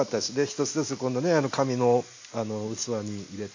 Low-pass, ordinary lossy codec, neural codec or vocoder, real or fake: 7.2 kHz; AAC, 48 kbps; none; real